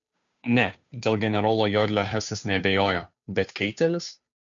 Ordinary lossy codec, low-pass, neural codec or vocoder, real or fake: MP3, 64 kbps; 7.2 kHz; codec, 16 kHz, 2 kbps, FunCodec, trained on Chinese and English, 25 frames a second; fake